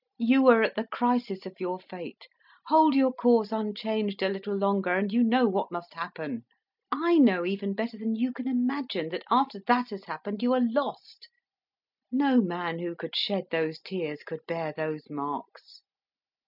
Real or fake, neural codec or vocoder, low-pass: real; none; 5.4 kHz